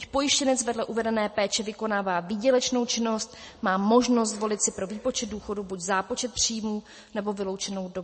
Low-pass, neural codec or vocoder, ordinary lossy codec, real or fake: 9.9 kHz; none; MP3, 32 kbps; real